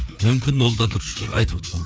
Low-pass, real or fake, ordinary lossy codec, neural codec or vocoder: none; fake; none; codec, 16 kHz, 4 kbps, FreqCodec, larger model